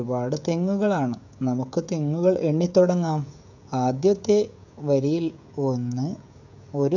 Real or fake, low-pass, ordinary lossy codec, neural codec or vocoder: fake; 7.2 kHz; none; codec, 16 kHz, 16 kbps, FreqCodec, smaller model